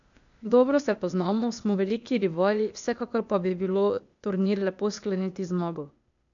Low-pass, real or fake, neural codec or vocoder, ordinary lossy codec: 7.2 kHz; fake; codec, 16 kHz, 0.8 kbps, ZipCodec; none